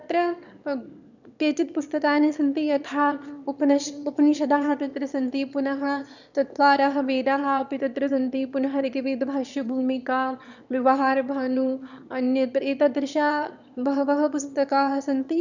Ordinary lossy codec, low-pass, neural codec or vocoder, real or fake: none; 7.2 kHz; autoencoder, 22.05 kHz, a latent of 192 numbers a frame, VITS, trained on one speaker; fake